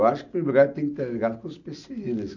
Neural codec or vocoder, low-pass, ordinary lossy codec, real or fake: none; 7.2 kHz; none; real